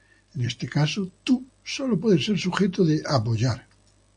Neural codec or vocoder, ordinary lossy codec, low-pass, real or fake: none; AAC, 64 kbps; 9.9 kHz; real